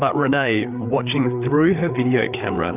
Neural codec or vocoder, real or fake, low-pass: codec, 16 kHz, 4 kbps, FreqCodec, larger model; fake; 3.6 kHz